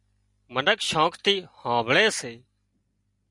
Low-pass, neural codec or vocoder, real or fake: 10.8 kHz; none; real